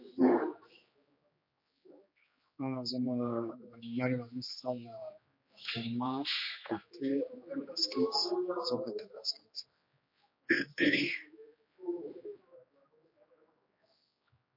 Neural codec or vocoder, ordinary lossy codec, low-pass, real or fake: codec, 16 kHz, 2 kbps, X-Codec, HuBERT features, trained on general audio; MP3, 32 kbps; 5.4 kHz; fake